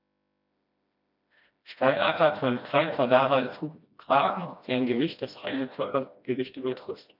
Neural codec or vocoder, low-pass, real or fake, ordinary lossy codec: codec, 16 kHz, 1 kbps, FreqCodec, smaller model; 5.4 kHz; fake; none